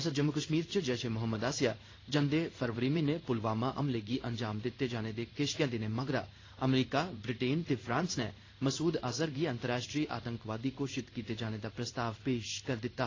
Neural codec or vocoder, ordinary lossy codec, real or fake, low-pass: codec, 16 kHz in and 24 kHz out, 1 kbps, XY-Tokenizer; AAC, 32 kbps; fake; 7.2 kHz